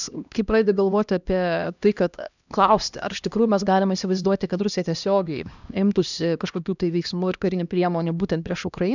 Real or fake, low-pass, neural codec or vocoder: fake; 7.2 kHz; codec, 16 kHz, 1 kbps, X-Codec, HuBERT features, trained on LibriSpeech